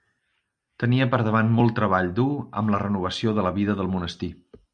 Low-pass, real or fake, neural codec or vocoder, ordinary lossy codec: 9.9 kHz; real; none; Opus, 64 kbps